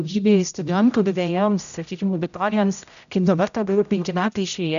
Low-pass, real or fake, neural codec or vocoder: 7.2 kHz; fake; codec, 16 kHz, 0.5 kbps, X-Codec, HuBERT features, trained on general audio